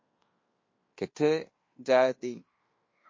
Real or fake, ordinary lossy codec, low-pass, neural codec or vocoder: fake; MP3, 32 kbps; 7.2 kHz; codec, 16 kHz in and 24 kHz out, 0.9 kbps, LongCat-Audio-Codec, fine tuned four codebook decoder